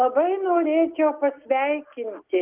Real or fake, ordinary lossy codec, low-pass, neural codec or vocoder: real; Opus, 32 kbps; 3.6 kHz; none